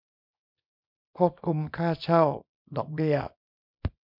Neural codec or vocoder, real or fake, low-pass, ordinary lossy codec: codec, 24 kHz, 0.9 kbps, WavTokenizer, small release; fake; 5.4 kHz; MP3, 48 kbps